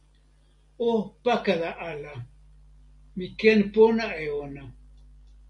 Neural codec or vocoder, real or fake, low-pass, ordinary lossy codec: none; real; 10.8 kHz; MP3, 48 kbps